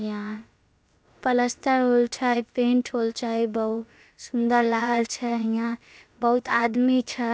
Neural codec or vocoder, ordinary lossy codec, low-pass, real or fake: codec, 16 kHz, about 1 kbps, DyCAST, with the encoder's durations; none; none; fake